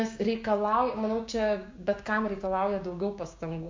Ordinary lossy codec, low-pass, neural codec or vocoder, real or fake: MP3, 48 kbps; 7.2 kHz; codec, 16 kHz, 6 kbps, DAC; fake